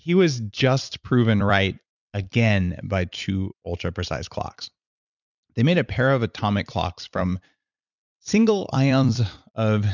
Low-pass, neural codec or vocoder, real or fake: 7.2 kHz; vocoder, 44.1 kHz, 128 mel bands every 256 samples, BigVGAN v2; fake